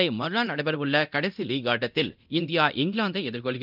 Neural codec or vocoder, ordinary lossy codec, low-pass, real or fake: codec, 24 kHz, 0.9 kbps, DualCodec; none; 5.4 kHz; fake